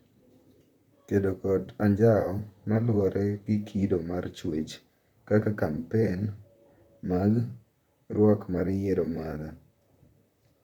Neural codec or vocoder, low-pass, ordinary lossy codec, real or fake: vocoder, 44.1 kHz, 128 mel bands, Pupu-Vocoder; 19.8 kHz; none; fake